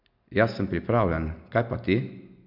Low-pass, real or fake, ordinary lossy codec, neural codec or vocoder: 5.4 kHz; real; MP3, 48 kbps; none